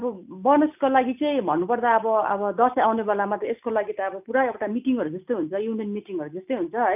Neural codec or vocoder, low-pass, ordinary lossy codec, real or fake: none; 3.6 kHz; none; real